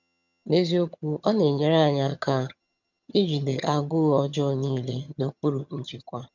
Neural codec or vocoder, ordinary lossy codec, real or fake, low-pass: vocoder, 22.05 kHz, 80 mel bands, HiFi-GAN; none; fake; 7.2 kHz